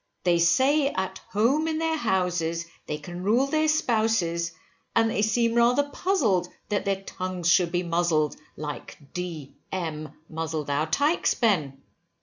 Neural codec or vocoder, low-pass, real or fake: none; 7.2 kHz; real